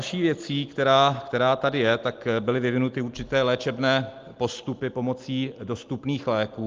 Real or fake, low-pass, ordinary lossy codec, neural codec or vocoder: real; 7.2 kHz; Opus, 24 kbps; none